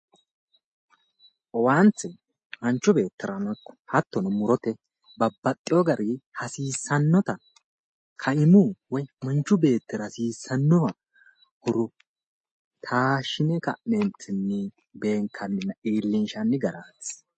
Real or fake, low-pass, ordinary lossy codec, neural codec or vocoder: real; 10.8 kHz; MP3, 32 kbps; none